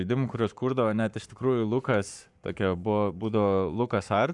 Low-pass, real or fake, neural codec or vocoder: 10.8 kHz; fake; codec, 44.1 kHz, 7.8 kbps, Pupu-Codec